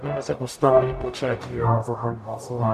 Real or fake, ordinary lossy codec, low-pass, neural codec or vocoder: fake; MP3, 96 kbps; 14.4 kHz; codec, 44.1 kHz, 0.9 kbps, DAC